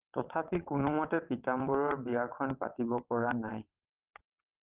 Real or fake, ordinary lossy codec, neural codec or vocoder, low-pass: fake; Opus, 24 kbps; vocoder, 22.05 kHz, 80 mel bands, WaveNeXt; 3.6 kHz